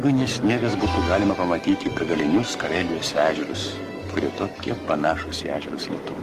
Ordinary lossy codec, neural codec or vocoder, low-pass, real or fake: Opus, 24 kbps; codec, 44.1 kHz, 7.8 kbps, DAC; 14.4 kHz; fake